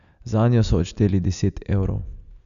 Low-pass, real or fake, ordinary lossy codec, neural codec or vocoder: 7.2 kHz; real; none; none